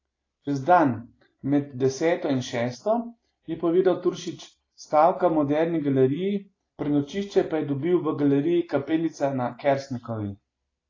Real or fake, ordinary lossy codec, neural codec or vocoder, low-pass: real; AAC, 32 kbps; none; 7.2 kHz